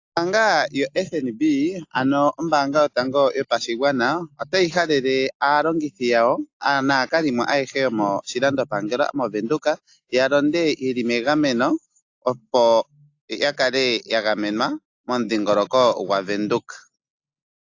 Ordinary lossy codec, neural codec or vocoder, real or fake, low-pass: AAC, 48 kbps; none; real; 7.2 kHz